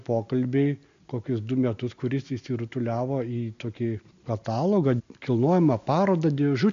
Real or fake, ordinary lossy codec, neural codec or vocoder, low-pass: real; MP3, 64 kbps; none; 7.2 kHz